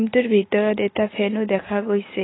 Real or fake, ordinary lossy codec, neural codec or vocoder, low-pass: real; AAC, 16 kbps; none; 7.2 kHz